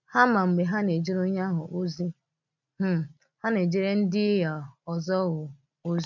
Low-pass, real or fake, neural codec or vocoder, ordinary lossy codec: 7.2 kHz; real; none; none